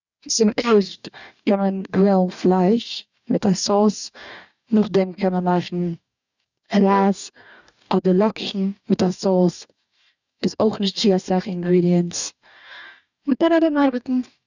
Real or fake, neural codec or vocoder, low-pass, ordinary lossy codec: fake; codec, 44.1 kHz, 2.6 kbps, DAC; 7.2 kHz; none